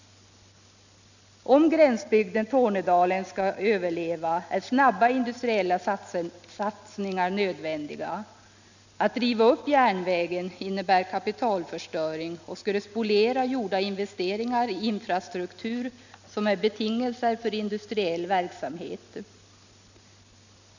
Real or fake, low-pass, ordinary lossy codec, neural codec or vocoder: real; 7.2 kHz; none; none